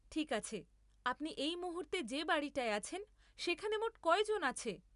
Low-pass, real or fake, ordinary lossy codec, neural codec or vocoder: 10.8 kHz; real; none; none